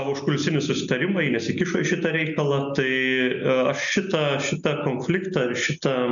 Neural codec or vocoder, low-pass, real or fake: none; 7.2 kHz; real